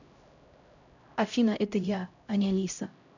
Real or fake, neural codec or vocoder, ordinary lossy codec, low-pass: fake; codec, 16 kHz, 0.5 kbps, X-Codec, HuBERT features, trained on LibriSpeech; none; 7.2 kHz